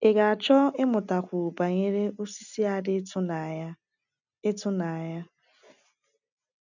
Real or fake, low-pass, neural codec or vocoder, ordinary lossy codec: real; 7.2 kHz; none; none